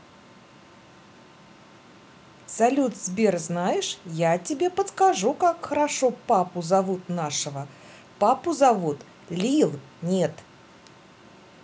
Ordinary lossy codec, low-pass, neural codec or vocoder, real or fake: none; none; none; real